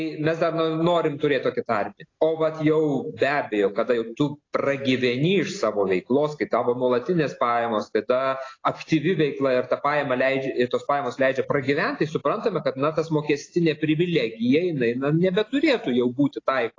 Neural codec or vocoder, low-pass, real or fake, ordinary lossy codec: none; 7.2 kHz; real; AAC, 32 kbps